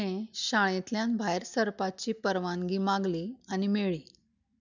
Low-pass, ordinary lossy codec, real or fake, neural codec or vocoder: 7.2 kHz; none; real; none